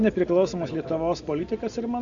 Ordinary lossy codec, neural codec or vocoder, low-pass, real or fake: AAC, 64 kbps; none; 7.2 kHz; real